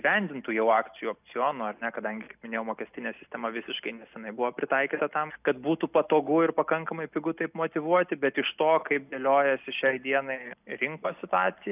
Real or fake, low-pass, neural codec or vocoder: real; 3.6 kHz; none